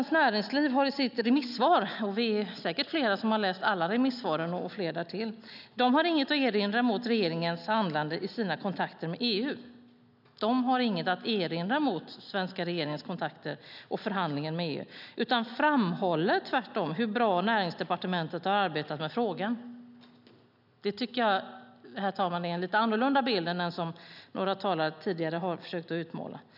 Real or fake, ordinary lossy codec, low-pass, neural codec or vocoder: real; none; 5.4 kHz; none